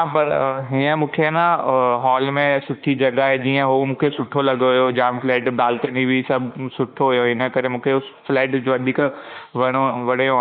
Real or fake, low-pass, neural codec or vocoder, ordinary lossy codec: fake; 5.4 kHz; autoencoder, 48 kHz, 32 numbers a frame, DAC-VAE, trained on Japanese speech; none